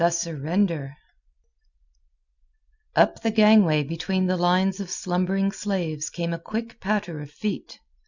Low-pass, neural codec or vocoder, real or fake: 7.2 kHz; none; real